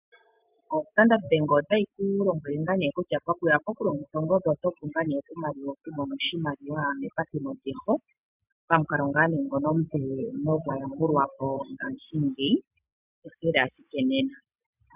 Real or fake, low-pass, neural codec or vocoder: real; 3.6 kHz; none